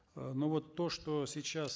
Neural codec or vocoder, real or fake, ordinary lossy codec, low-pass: none; real; none; none